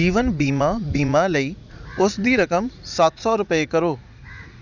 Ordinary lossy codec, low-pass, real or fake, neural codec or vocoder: none; 7.2 kHz; fake; vocoder, 44.1 kHz, 80 mel bands, Vocos